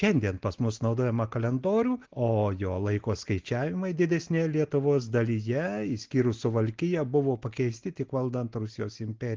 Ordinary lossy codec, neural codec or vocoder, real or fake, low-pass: Opus, 16 kbps; none; real; 7.2 kHz